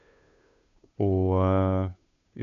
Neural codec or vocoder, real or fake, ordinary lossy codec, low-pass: codec, 16 kHz, 2 kbps, FunCodec, trained on Chinese and English, 25 frames a second; fake; none; 7.2 kHz